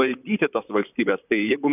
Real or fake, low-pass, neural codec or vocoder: fake; 3.6 kHz; vocoder, 44.1 kHz, 128 mel bands, Pupu-Vocoder